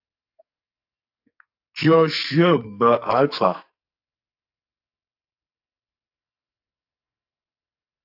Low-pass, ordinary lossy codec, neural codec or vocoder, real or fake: 5.4 kHz; AAC, 48 kbps; codec, 44.1 kHz, 2.6 kbps, SNAC; fake